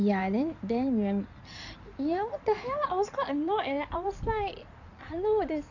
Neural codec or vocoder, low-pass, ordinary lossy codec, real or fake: codec, 16 kHz in and 24 kHz out, 2.2 kbps, FireRedTTS-2 codec; 7.2 kHz; none; fake